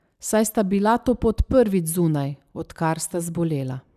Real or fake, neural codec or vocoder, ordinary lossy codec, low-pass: real; none; none; 14.4 kHz